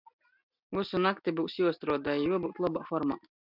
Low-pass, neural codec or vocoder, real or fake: 5.4 kHz; none; real